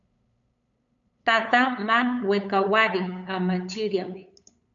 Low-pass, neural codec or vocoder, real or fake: 7.2 kHz; codec, 16 kHz, 8 kbps, FunCodec, trained on LibriTTS, 25 frames a second; fake